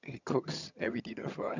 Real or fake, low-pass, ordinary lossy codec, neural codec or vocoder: fake; 7.2 kHz; none; vocoder, 22.05 kHz, 80 mel bands, HiFi-GAN